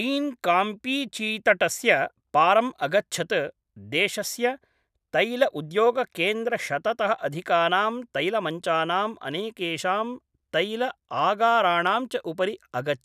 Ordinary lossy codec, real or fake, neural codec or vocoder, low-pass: none; real; none; 14.4 kHz